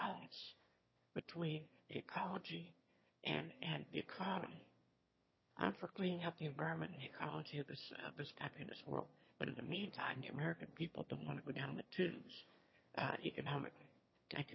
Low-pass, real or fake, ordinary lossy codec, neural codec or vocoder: 7.2 kHz; fake; MP3, 24 kbps; autoencoder, 22.05 kHz, a latent of 192 numbers a frame, VITS, trained on one speaker